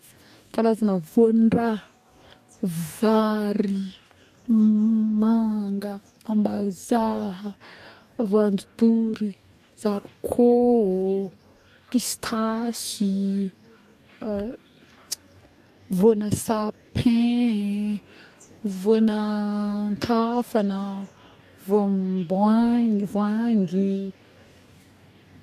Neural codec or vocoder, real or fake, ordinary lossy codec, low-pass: codec, 44.1 kHz, 2.6 kbps, DAC; fake; AAC, 96 kbps; 14.4 kHz